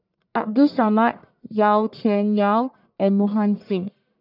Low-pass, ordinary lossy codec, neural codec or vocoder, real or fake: 5.4 kHz; none; codec, 44.1 kHz, 1.7 kbps, Pupu-Codec; fake